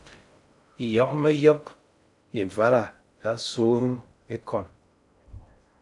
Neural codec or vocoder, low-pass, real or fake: codec, 16 kHz in and 24 kHz out, 0.6 kbps, FocalCodec, streaming, 4096 codes; 10.8 kHz; fake